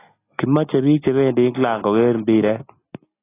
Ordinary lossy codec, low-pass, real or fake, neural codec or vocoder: AAC, 16 kbps; 3.6 kHz; real; none